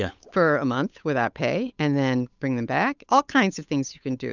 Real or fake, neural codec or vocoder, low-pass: fake; codec, 16 kHz, 8 kbps, FunCodec, trained on Chinese and English, 25 frames a second; 7.2 kHz